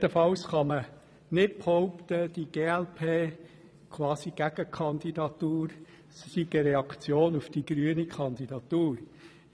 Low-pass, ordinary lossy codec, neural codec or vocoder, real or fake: none; none; vocoder, 22.05 kHz, 80 mel bands, Vocos; fake